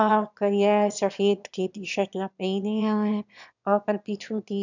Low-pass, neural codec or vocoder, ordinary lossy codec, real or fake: 7.2 kHz; autoencoder, 22.05 kHz, a latent of 192 numbers a frame, VITS, trained on one speaker; none; fake